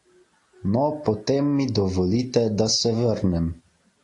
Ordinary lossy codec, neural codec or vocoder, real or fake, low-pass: AAC, 48 kbps; none; real; 10.8 kHz